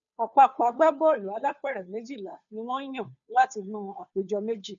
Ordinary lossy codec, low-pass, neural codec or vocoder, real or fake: none; 7.2 kHz; codec, 16 kHz, 2 kbps, FunCodec, trained on Chinese and English, 25 frames a second; fake